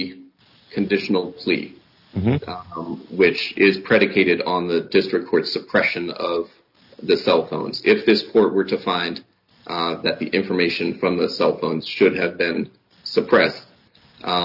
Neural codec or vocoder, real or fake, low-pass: none; real; 5.4 kHz